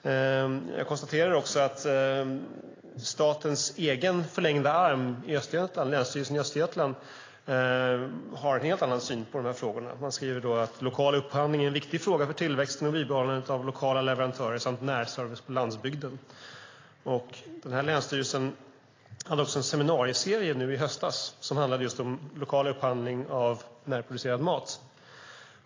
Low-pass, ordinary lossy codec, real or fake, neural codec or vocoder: 7.2 kHz; AAC, 32 kbps; real; none